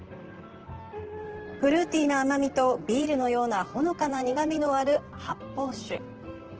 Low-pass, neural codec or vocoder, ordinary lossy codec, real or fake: 7.2 kHz; vocoder, 44.1 kHz, 128 mel bands, Pupu-Vocoder; Opus, 16 kbps; fake